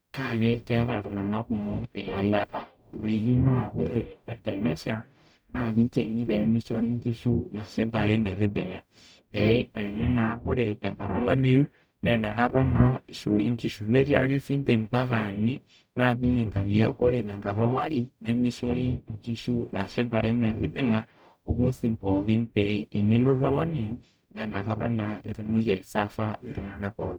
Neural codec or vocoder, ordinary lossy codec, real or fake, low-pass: codec, 44.1 kHz, 0.9 kbps, DAC; none; fake; none